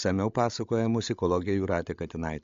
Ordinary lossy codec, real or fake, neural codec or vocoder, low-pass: MP3, 48 kbps; fake; codec, 16 kHz, 16 kbps, FreqCodec, larger model; 7.2 kHz